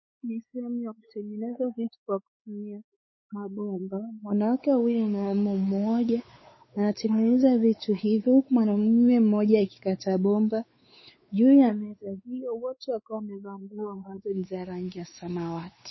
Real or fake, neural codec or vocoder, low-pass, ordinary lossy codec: fake; codec, 16 kHz, 4 kbps, X-Codec, WavLM features, trained on Multilingual LibriSpeech; 7.2 kHz; MP3, 24 kbps